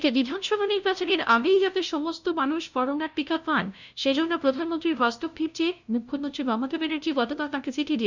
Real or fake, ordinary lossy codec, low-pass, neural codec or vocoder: fake; none; 7.2 kHz; codec, 16 kHz, 0.5 kbps, FunCodec, trained on LibriTTS, 25 frames a second